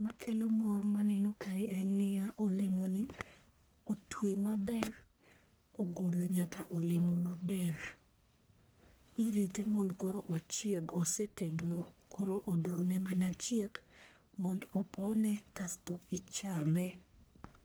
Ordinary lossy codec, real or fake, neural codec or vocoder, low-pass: none; fake; codec, 44.1 kHz, 1.7 kbps, Pupu-Codec; none